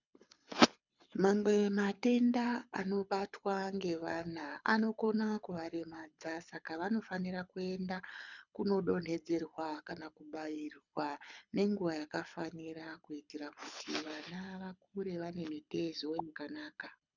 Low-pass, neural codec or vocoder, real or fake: 7.2 kHz; codec, 24 kHz, 6 kbps, HILCodec; fake